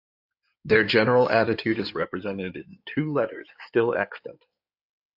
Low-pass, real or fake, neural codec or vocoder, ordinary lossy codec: 5.4 kHz; fake; codec, 16 kHz in and 24 kHz out, 2.2 kbps, FireRedTTS-2 codec; AAC, 48 kbps